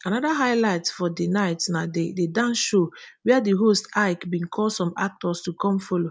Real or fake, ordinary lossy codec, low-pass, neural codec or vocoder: real; none; none; none